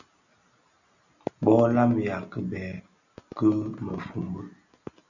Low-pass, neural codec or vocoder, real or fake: 7.2 kHz; none; real